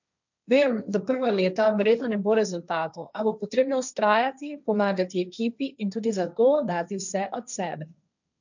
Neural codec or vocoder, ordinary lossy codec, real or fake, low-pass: codec, 16 kHz, 1.1 kbps, Voila-Tokenizer; none; fake; 7.2 kHz